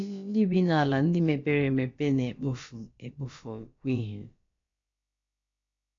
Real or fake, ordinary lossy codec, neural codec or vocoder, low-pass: fake; AAC, 48 kbps; codec, 16 kHz, about 1 kbps, DyCAST, with the encoder's durations; 7.2 kHz